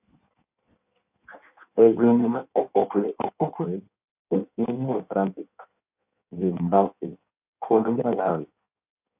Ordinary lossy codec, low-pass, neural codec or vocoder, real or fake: AAC, 32 kbps; 3.6 kHz; codec, 16 kHz in and 24 kHz out, 1.1 kbps, FireRedTTS-2 codec; fake